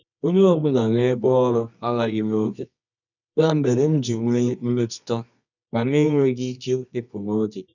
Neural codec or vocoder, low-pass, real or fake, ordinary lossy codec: codec, 24 kHz, 0.9 kbps, WavTokenizer, medium music audio release; 7.2 kHz; fake; none